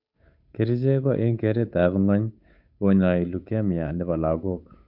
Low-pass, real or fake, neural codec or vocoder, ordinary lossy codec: 5.4 kHz; fake; codec, 16 kHz, 8 kbps, FunCodec, trained on Chinese and English, 25 frames a second; none